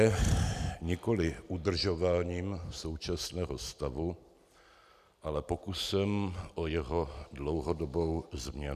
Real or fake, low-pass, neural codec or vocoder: real; 14.4 kHz; none